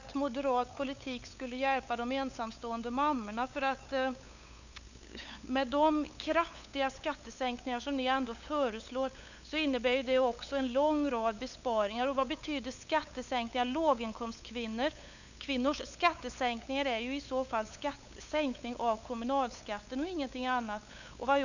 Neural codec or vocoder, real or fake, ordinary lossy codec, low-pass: codec, 16 kHz, 8 kbps, FunCodec, trained on LibriTTS, 25 frames a second; fake; none; 7.2 kHz